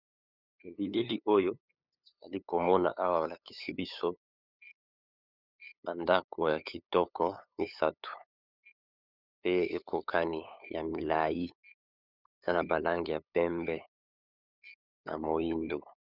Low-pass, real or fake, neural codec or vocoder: 5.4 kHz; fake; codec, 16 kHz, 8 kbps, FunCodec, trained on LibriTTS, 25 frames a second